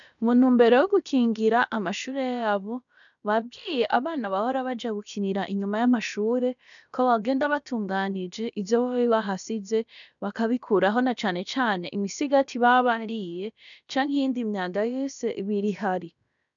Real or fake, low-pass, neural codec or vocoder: fake; 7.2 kHz; codec, 16 kHz, about 1 kbps, DyCAST, with the encoder's durations